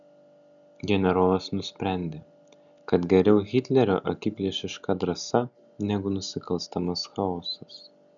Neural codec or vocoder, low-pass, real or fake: none; 7.2 kHz; real